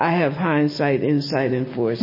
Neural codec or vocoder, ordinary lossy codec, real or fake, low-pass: none; MP3, 24 kbps; real; 5.4 kHz